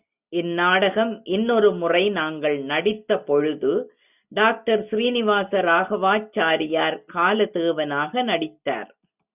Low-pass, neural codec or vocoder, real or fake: 3.6 kHz; none; real